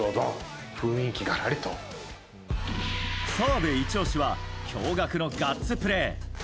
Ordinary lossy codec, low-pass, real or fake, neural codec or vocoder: none; none; real; none